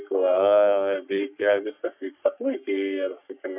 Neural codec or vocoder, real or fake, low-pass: codec, 44.1 kHz, 3.4 kbps, Pupu-Codec; fake; 3.6 kHz